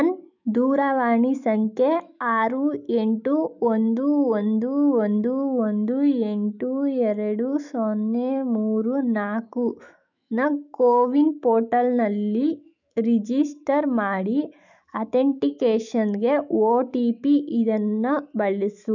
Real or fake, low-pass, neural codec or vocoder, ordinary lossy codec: fake; 7.2 kHz; autoencoder, 48 kHz, 128 numbers a frame, DAC-VAE, trained on Japanese speech; none